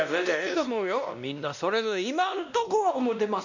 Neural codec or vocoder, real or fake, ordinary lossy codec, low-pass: codec, 16 kHz, 1 kbps, X-Codec, WavLM features, trained on Multilingual LibriSpeech; fake; none; 7.2 kHz